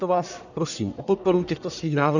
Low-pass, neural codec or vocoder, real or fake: 7.2 kHz; codec, 44.1 kHz, 1.7 kbps, Pupu-Codec; fake